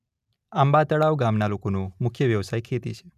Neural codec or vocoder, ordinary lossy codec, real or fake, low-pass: none; none; real; 14.4 kHz